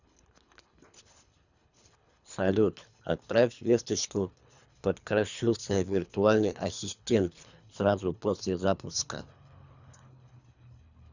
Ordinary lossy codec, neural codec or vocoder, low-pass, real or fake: none; codec, 24 kHz, 3 kbps, HILCodec; 7.2 kHz; fake